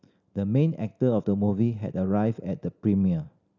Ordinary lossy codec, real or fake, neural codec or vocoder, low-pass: none; real; none; 7.2 kHz